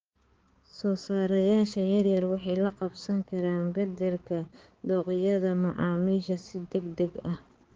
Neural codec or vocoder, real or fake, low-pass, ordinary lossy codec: codec, 16 kHz, 4 kbps, X-Codec, HuBERT features, trained on balanced general audio; fake; 7.2 kHz; Opus, 16 kbps